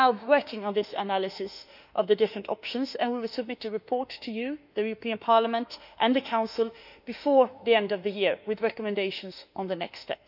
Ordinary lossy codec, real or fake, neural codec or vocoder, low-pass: none; fake; autoencoder, 48 kHz, 32 numbers a frame, DAC-VAE, trained on Japanese speech; 5.4 kHz